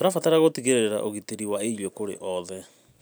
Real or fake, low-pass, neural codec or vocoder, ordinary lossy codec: real; none; none; none